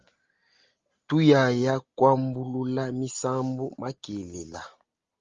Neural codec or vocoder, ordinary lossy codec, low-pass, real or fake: none; Opus, 32 kbps; 7.2 kHz; real